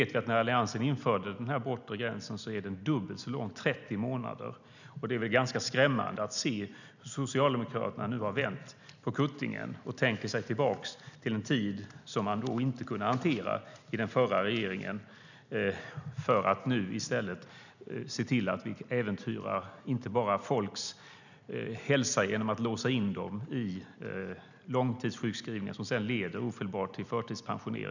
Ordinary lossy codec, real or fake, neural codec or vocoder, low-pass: none; real; none; 7.2 kHz